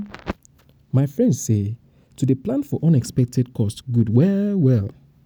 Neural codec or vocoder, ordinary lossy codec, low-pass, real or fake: none; none; none; real